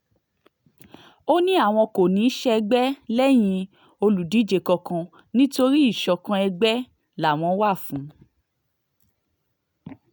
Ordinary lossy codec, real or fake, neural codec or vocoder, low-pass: none; real; none; none